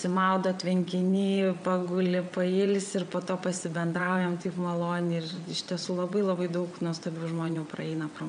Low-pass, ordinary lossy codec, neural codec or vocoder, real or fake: 9.9 kHz; MP3, 96 kbps; vocoder, 22.05 kHz, 80 mel bands, WaveNeXt; fake